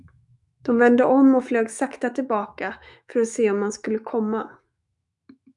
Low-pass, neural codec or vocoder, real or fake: 10.8 kHz; autoencoder, 48 kHz, 128 numbers a frame, DAC-VAE, trained on Japanese speech; fake